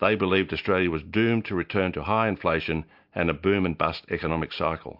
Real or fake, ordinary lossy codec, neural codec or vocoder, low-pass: real; MP3, 48 kbps; none; 5.4 kHz